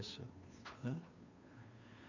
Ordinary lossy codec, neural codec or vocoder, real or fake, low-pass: none; codec, 16 kHz, 2 kbps, FunCodec, trained on Chinese and English, 25 frames a second; fake; 7.2 kHz